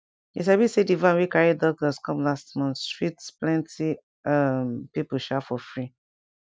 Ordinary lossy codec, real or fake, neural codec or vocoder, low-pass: none; real; none; none